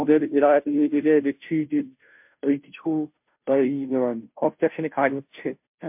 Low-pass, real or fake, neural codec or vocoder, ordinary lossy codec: 3.6 kHz; fake; codec, 16 kHz, 0.5 kbps, FunCodec, trained on Chinese and English, 25 frames a second; MP3, 32 kbps